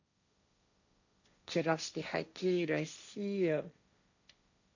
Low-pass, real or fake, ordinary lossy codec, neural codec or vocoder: 7.2 kHz; fake; none; codec, 16 kHz, 1.1 kbps, Voila-Tokenizer